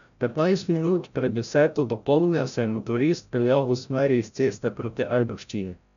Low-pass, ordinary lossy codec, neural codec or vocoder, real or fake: 7.2 kHz; none; codec, 16 kHz, 0.5 kbps, FreqCodec, larger model; fake